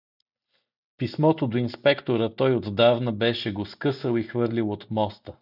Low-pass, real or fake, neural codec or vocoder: 5.4 kHz; real; none